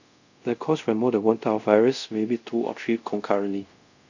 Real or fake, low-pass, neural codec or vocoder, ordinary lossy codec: fake; 7.2 kHz; codec, 24 kHz, 0.5 kbps, DualCodec; none